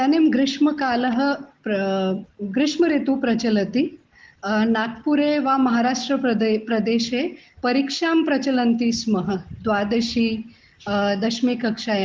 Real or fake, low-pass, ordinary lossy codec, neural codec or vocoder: real; 7.2 kHz; Opus, 16 kbps; none